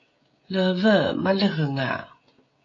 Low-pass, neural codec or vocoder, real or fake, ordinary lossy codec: 7.2 kHz; codec, 16 kHz, 16 kbps, FreqCodec, smaller model; fake; AAC, 32 kbps